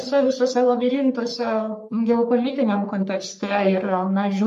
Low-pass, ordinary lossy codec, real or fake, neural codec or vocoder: 14.4 kHz; MP3, 64 kbps; fake; codec, 44.1 kHz, 3.4 kbps, Pupu-Codec